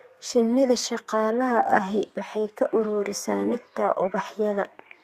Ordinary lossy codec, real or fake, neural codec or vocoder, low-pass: Opus, 64 kbps; fake; codec, 32 kHz, 1.9 kbps, SNAC; 14.4 kHz